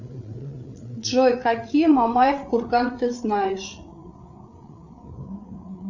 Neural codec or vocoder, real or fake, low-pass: codec, 16 kHz, 8 kbps, FreqCodec, larger model; fake; 7.2 kHz